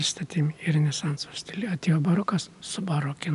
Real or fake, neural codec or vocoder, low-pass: real; none; 10.8 kHz